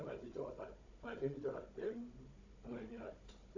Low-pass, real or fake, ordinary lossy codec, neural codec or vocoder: 7.2 kHz; fake; AAC, 48 kbps; codec, 16 kHz, 16 kbps, FunCodec, trained on Chinese and English, 50 frames a second